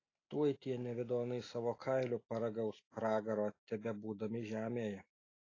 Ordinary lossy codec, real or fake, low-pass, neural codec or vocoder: AAC, 32 kbps; real; 7.2 kHz; none